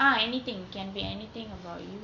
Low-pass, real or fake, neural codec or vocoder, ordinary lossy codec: 7.2 kHz; real; none; Opus, 64 kbps